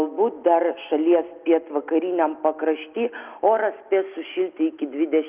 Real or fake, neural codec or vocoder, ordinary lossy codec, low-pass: real; none; Opus, 24 kbps; 3.6 kHz